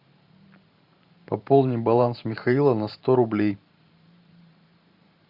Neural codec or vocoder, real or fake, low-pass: vocoder, 44.1 kHz, 128 mel bands every 512 samples, BigVGAN v2; fake; 5.4 kHz